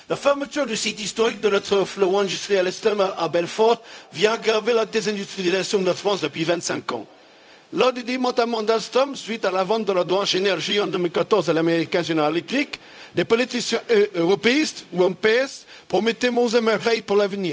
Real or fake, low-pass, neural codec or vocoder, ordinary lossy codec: fake; none; codec, 16 kHz, 0.4 kbps, LongCat-Audio-Codec; none